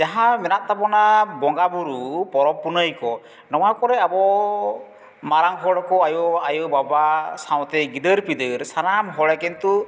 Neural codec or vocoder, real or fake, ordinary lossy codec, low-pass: none; real; none; none